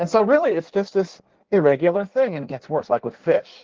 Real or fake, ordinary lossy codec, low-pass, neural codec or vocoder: fake; Opus, 16 kbps; 7.2 kHz; codec, 16 kHz in and 24 kHz out, 1.1 kbps, FireRedTTS-2 codec